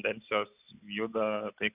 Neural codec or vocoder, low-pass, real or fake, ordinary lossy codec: none; 3.6 kHz; real; Opus, 64 kbps